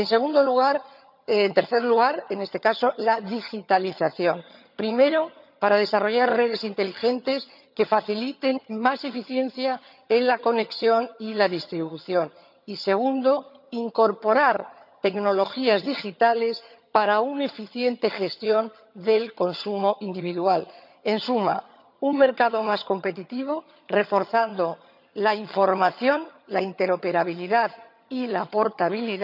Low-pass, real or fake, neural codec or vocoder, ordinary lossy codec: 5.4 kHz; fake; vocoder, 22.05 kHz, 80 mel bands, HiFi-GAN; none